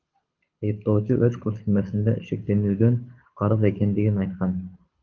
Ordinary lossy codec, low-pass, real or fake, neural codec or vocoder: Opus, 32 kbps; 7.2 kHz; fake; codec, 24 kHz, 6 kbps, HILCodec